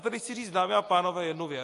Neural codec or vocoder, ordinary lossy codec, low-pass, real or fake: none; AAC, 48 kbps; 10.8 kHz; real